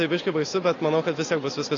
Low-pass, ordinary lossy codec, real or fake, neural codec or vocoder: 7.2 kHz; AAC, 32 kbps; real; none